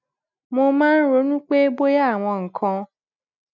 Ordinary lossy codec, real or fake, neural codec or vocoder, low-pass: none; real; none; 7.2 kHz